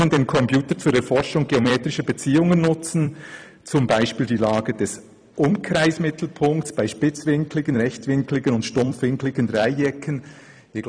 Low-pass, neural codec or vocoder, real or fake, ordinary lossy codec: 9.9 kHz; vocoder, 44.1 kHz, 128 mel bands every 256 samples, BigVGAN v2; fake; MP3, 96 kbps